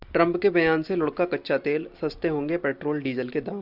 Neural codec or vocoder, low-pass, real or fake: none; 5.4 kHz; real